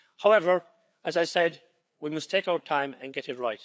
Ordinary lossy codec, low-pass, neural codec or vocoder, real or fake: none; none; codec, 16 kHz, 4 kbps, FreqCodec, larger model; fake